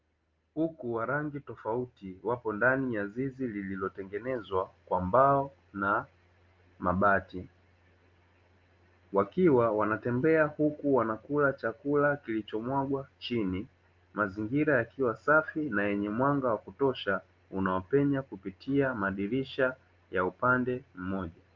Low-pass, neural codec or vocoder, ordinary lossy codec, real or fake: 7.2 kHz; none; Opus, 24 kbps; real